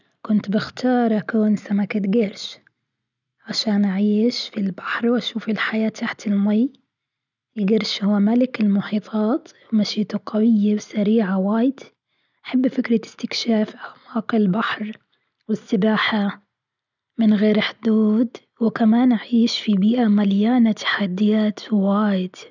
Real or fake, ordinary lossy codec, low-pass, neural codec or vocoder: real; none; 7.2 kHz; none